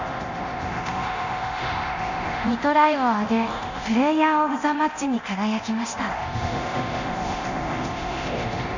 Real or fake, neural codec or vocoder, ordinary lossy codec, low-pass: fake; codec, 24 kHz, 0.9 kbps, DualCodec; Opus, 64 kbps; 7.2 kHz